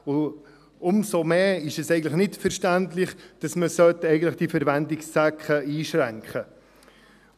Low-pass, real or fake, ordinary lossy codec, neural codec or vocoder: 14.4 kHz; real; none; none